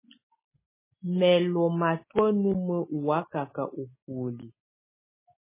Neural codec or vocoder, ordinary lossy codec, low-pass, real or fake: none; MP3, 16 kbps; 3.6 kHz; real